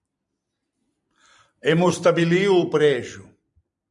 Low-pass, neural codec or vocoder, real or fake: 10.8 kHz; vocoder, 44.1 kHz, 128 mel bands every 256 samples, BigVGAN v2; fake